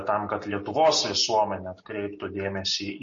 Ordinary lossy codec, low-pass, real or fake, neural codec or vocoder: MP3, 32 kbps; 7.2 kHz; real; none